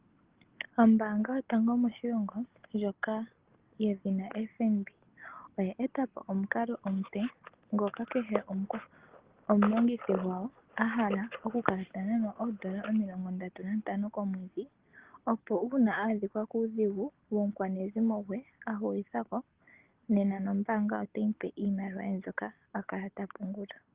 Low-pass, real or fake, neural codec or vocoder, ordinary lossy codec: 3.6 kHz; real; none; Opus, 16 kbps